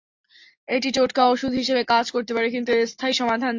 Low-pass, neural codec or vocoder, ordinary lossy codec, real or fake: 7.2 kHz; none; AAC, 48 kbps; real